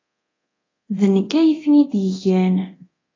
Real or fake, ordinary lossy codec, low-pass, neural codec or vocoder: fake; AAC, 32 kbps; 7.2 kHz; codec, 24 kHz, 0.9 kbps, DualCodec